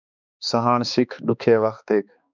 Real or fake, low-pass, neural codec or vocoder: fake; 7.2 kHz; codec, 16 kHz, 2 kbps, X-Codec, HuBERT features, trained on balanced general audio